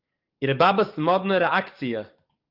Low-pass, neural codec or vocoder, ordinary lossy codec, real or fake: 5.4 kHz; codec, 44.1 kHz, 7.8 kbps, DAC; Opus, 32 kbps; fake